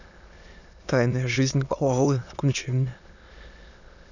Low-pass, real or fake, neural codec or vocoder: 7.2 kHz; fake; autoencoder, 22.05 kHz, a latent of 192 numbers a frame, VITS, trained on many speakers